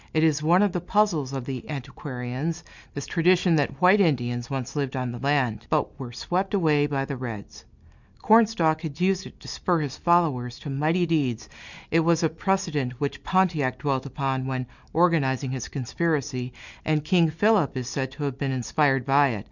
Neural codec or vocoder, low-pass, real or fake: none; 7.2 kHz; real